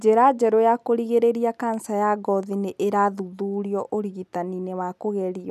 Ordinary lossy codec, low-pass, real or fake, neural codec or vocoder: none; 14.4 kHz; real; none